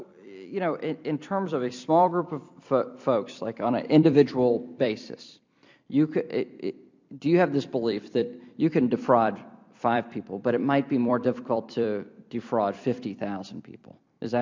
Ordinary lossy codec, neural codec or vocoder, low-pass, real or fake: MP3, 64 kbps; vocoder, 44.1 kHz, 128 mel bands every 256 samples, BigVGAN v2; 7.2 kHz; fake